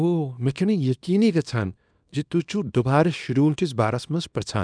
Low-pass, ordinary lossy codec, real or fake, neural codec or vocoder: 9.9 kHz; none; fake; codec, 24 kHz, 0.9 kbps, WavTokenizer, small release